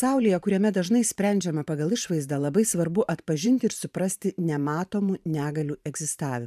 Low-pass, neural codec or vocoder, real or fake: 14.4 kHz; vocoder, 44.1 kHz, 128 mel bands every 512 samples, BigVGAN v2; fake